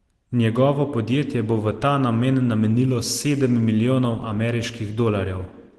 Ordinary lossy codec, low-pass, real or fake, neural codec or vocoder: Opus, 16 kbps; 10.8 kHz; real; none